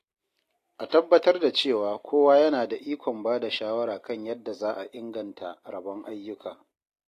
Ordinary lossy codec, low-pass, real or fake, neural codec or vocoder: AAC, 48 kbps; 14.4 kHz; real; none